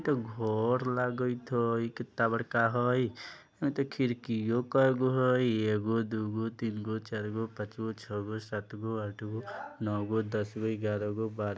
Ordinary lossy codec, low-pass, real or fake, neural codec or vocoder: none; none; real; none